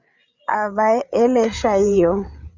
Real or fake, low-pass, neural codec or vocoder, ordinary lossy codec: fake; 7.2 kHz; codec, 16 kHz, 8 kbps, FreqCodec, larger model; Opus, 64 kbps